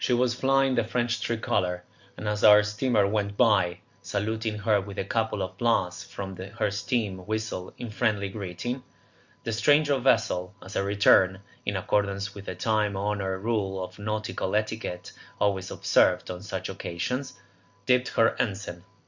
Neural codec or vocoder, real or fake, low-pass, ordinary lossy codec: none; real; 7.2 kHz; Opus, 64 kbps